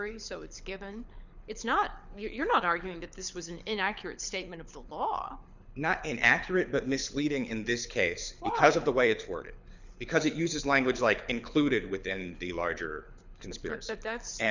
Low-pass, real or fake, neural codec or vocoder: 7.2 kHz; fake; codec, 24 kHz, 6 kbps, HILCodec